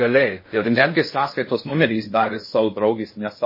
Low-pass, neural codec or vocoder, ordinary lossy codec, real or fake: 5.4 kHz; codec, 16 kHz in and 24 kHz out, 0.6 kbps, FocalCodec, streaming, 2048 codes; MP3, 24 kbps; fake